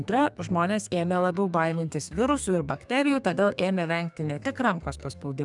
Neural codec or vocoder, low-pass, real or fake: codec, 32 kHz, 1.9 kbps, SNAC; 10.8 kHz; fake